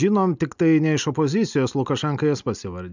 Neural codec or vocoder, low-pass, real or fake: none; 7.2 kHz; real